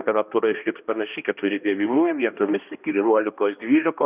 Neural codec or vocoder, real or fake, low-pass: codec, 16 kHz, 1 kbps, X-Codec, HuBERT features, trained on balanced general audio; fake; 3.6 kHz